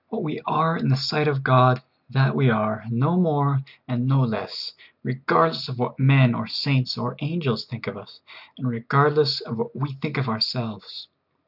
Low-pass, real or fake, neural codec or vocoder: 5.4 kHz; real; none